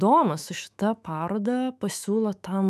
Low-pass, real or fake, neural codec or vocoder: 14.4 kHz; fake; autoencoder, 48 kHz, 128 numbers a frame, DAC-VAE, trained on Japanese speech